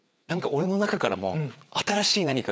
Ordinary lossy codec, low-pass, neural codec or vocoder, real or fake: none; none; codec, 16 kHz, 4 kbps, FreqCodec, larger model; fake